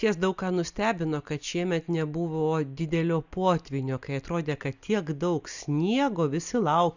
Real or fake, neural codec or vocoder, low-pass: real; none; 7.2 kHz